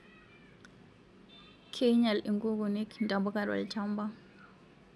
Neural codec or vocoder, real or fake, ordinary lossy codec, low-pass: none; real; none; none